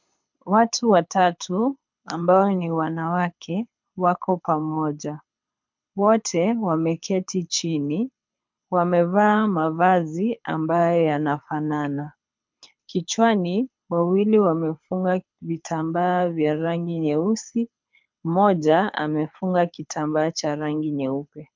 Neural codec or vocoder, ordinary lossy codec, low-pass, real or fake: codec, 24 kHz, 6 kbps, HILCodec; MP3, 64 kbps; 7.2 kHz; fake